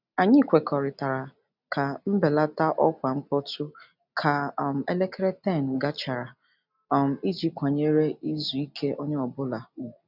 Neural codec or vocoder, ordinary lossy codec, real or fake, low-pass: none; none; real; 5.4 kHz